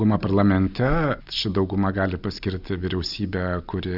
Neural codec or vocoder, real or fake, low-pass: none; real; 5.4 kHz